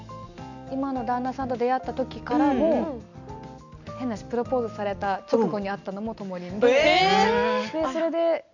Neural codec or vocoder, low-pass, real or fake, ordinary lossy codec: none; 7.2 kHz; real; none